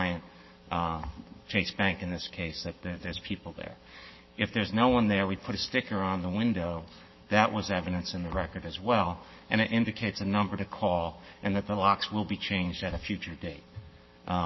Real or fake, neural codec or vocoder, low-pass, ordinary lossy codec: fake; codec, 44.1 kHz, 7.8 kbps, Pupu-Codec; 7.2 kHz; MP3, 24 kbps